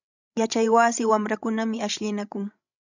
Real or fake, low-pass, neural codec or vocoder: fake; 7.2 kHz; codec, 16 kHz, 16 kbps, FreqCodec, larger model